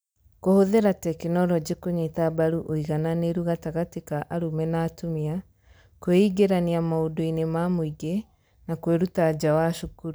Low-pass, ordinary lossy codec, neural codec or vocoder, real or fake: none; none; none; real